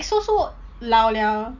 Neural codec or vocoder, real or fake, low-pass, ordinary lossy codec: none; real; 7.2 kHz; none